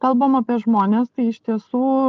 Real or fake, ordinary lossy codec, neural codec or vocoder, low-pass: real; Opus, 24 kbps; none; 7.2 kHz